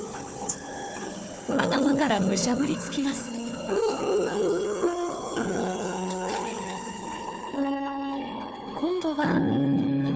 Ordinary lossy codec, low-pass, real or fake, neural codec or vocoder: none; none; fake; codec, 16 kHz, 4 kbps, FunCodec, trained on Chinese and English, 50 frames a second